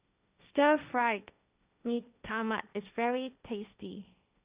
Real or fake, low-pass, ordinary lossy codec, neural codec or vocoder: fake; 3.6 kHz; Opus, 64 kbps; codec, 16 kHz, 1.1 kbps, Voila-Tokenizer